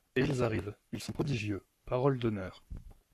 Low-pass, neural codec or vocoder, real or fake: 14.4 kHz; codec, 44.1 kHz, 7.8 kbps, Pupu-Codec; fake